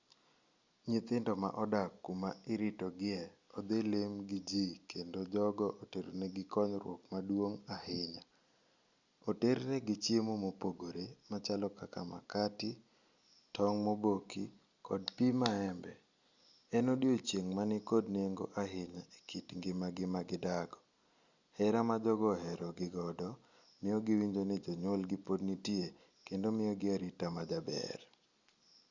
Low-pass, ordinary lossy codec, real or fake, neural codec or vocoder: 7.2 kHz; Opus, 64 kbps; real; none